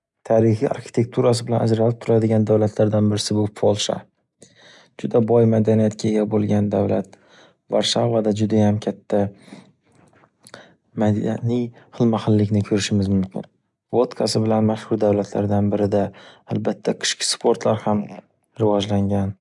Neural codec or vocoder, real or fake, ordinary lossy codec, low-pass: none; real; none; 10.8 kHz